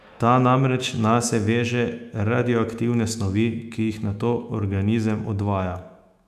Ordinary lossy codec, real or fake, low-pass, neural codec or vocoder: none; fake; 14.4 kHz; autoencoder, 48 kHz, 128 numbers a frame, DAC-VAE, trained on Japanese speech